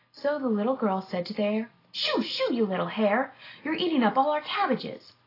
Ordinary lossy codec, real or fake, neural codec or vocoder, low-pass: AAC, 24 kbps; real; none; 5.4 kHz